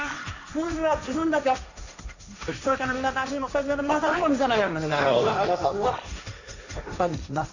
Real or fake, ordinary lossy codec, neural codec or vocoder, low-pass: fake; none; codec, 16 kHz, 1.1 kbps, Voila-Tokenizer; 7.2 kHz